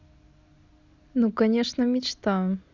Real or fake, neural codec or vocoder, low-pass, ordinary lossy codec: real; none; 7.2 kHz; Opus, 64 kbps